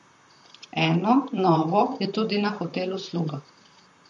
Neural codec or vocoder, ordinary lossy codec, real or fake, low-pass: vocoder, 44.1 kHz, 128 mel bands, Pupu-Vocoder; MP3, 48 kbps; fake; 14.4 kHz